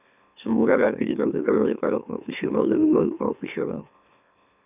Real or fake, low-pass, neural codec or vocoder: fake; 3.6 kHz; autoencoder, 44.1 kHz, a latent of 192 numbers a frame, MeloTTS